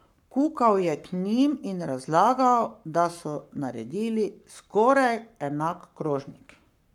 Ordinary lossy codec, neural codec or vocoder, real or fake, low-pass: none; codec, 44.1 kHz, 7.8 kbps, Pupu-Codec; fake; 19.8 kHz